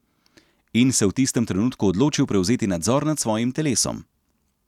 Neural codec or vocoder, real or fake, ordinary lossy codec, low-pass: none; real; none; 19.8 kHz